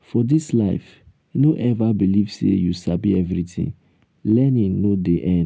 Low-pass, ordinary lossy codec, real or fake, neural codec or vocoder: none; none; real; none